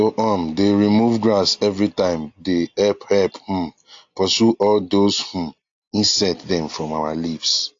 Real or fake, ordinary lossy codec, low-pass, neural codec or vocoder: real; AAC, 48 kbps; 7.2 kHz; none